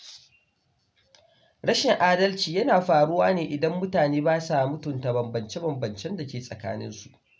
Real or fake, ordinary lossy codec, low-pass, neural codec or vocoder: real; none; none; none